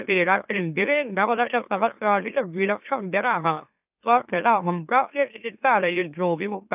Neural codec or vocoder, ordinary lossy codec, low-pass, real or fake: autoencoder, 44.1 kHz, a latent of 192 numbers a frame, MeloTTS; none; 3.6 kHz; fake